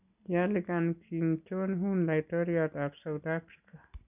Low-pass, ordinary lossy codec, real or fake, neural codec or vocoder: 3.6 kHz; none; real; none